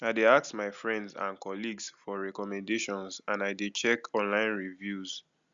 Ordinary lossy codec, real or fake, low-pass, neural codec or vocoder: Opus, 64 kbps; real; 7.2 kHz; none